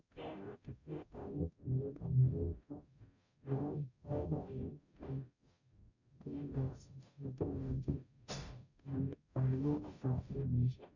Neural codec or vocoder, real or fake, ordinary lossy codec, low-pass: codec, 44.1 kHz, 0.9 kbps, DAC; fake; none; 7.2 kHz